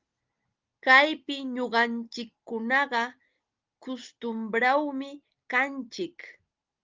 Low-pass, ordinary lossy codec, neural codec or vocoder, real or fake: 7.2 kHz; Opus, 32 kbps; none; real